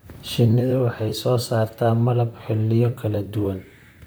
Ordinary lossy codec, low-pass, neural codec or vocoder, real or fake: none; none; vocoder, 44.1 kHz, 128 mel bands, Pupu-Vocoder; fake